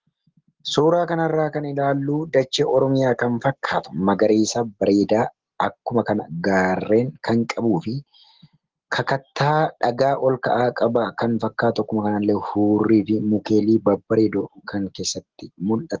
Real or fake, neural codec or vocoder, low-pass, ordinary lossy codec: real; none; 7.2 kHz; Opus, 16 kbps